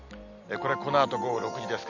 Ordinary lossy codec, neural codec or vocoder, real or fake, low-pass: none; none; real; 7.2 kHz